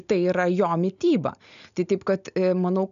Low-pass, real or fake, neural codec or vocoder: 7.2 kHz; real; none